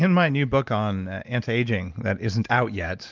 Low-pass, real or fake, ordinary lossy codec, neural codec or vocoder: 7.2 kHz; real; Opus, 32 kbps; none